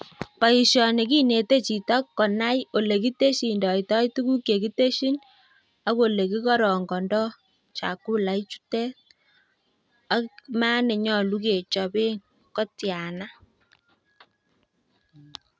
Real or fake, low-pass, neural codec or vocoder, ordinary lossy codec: real; none; none; none